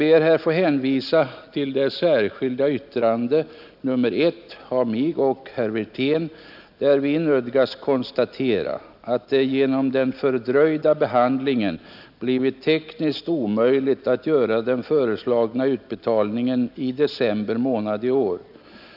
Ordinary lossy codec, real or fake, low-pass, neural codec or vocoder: none; real; 5.4 kHz; none